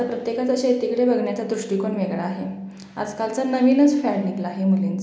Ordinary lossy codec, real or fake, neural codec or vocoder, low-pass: none; real; none; none